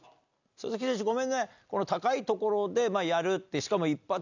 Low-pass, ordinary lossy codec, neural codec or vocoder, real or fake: 7.2 kHz; none; none; real